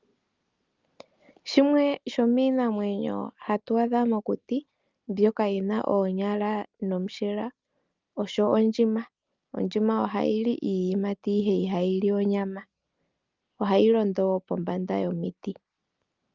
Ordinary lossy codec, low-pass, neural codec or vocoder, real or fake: Opus, 32 kbps; 7.2 kHz; none; real